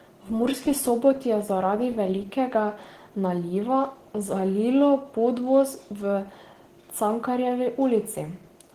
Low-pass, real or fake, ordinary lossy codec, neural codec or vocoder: 14.4 kHz; real; Opus, 16 kbps; none